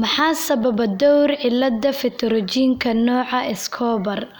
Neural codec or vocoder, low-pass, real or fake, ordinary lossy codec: none; none; real; none